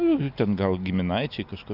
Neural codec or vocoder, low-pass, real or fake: none; 5.4 kHz; real